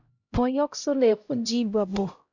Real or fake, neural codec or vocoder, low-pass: fake; codec, 16 kHz, 0.5 kbps, X-Codec, HuBERT features, trained on LibriSpeech; 7.2 kHz